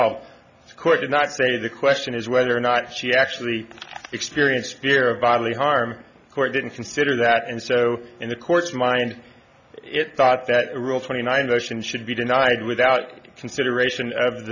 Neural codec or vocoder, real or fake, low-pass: none; real; 7.2 kHz